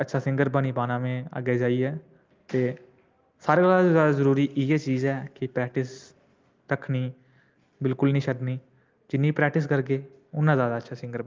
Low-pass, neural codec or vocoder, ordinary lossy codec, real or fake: 7.2 kHz; none; Opus, 32 kbps; real